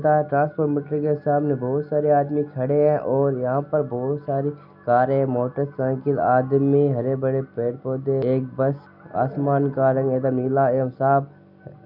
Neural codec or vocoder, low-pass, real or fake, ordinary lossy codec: none; 5.4 kHz; real; none